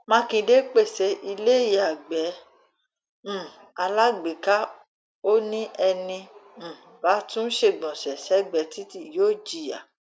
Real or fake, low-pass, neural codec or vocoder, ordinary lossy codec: real; none; none; none